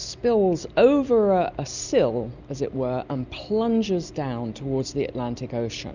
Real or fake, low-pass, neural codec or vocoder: real; 7.2 kHz; none